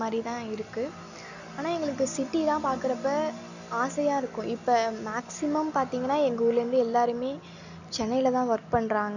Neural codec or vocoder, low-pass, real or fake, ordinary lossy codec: none; 7.2 kHz; real; none